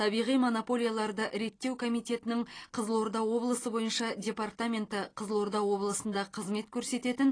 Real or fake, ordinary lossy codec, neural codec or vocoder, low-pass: real; AAC, 32 kbps; none; 9.9 kHz